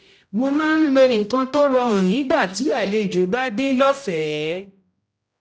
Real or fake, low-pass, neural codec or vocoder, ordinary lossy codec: fake; none; codec, 16 kHz, 0.5 kbps, X-Codec, HuBERT features, trained on general audio; none